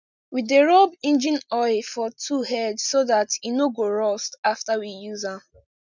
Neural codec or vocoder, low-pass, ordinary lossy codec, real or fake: none; 7.2 kHz; none; real